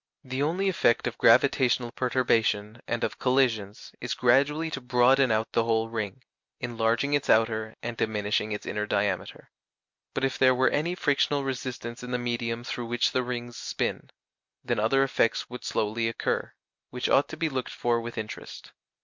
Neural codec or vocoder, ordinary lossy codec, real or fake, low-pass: none; MP3, 64 kbps; real; 7.2 kHz